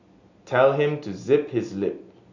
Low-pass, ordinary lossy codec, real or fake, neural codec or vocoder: 7.2 kHz; none; real; none